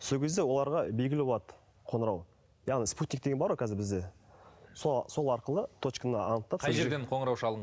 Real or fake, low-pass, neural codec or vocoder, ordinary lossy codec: real; none; none; none